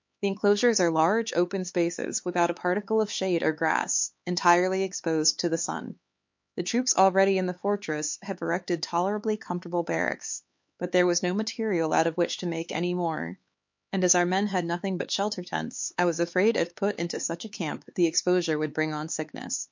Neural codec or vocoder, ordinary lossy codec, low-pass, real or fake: codec, 16 kHz, 4 kbps, X-Codec, HuBERT features, trained on LibriSpeech; MP3, 48 kbps; 7.2 kHz; fake